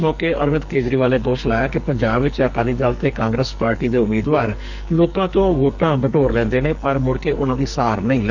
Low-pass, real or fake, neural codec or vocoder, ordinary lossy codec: 7.2 kHz; fake; codec, 44.1 kHz, 2.6 kbps, SNAC; none